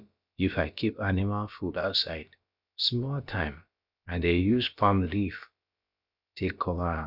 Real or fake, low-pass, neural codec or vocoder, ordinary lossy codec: fake; 5.4 kHz; codec, 16 kHz, about 1 kbps, DyCAST, with the encoder's durations; Opus, 64 kbps